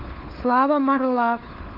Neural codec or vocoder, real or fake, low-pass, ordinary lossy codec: codec, 16 kHz, 4 kbps, FunCodec, trained on LibriTTS, 50 frames a second; fake; 5.4 kHz; Opus, 24 kbps